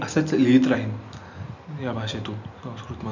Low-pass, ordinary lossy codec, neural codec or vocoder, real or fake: 7.2 kHz; none; none; real